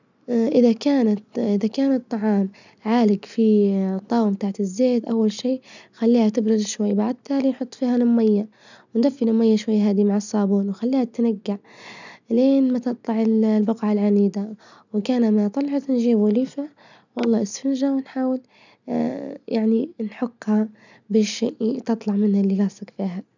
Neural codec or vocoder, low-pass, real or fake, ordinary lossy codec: none; 7.2 kHz; real; none